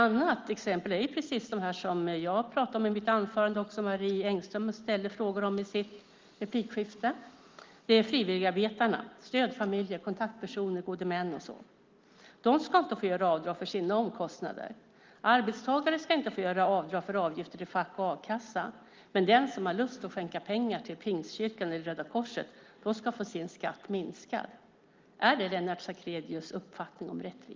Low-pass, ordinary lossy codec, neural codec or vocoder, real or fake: 7.2 kHz; Opus, 32 kbps; none; real